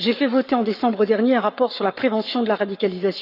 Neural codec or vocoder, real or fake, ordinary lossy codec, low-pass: codec, 44.1 kHz, 7.8 kbps, Pupu-Codec; fake; none; 5.4 kHz